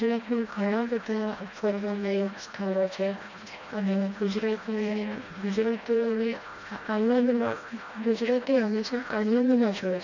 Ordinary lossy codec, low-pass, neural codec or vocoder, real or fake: none; 7.2 kHz; codec, 16 kHz, 1 kbps, FreqCodec, smaller model; fake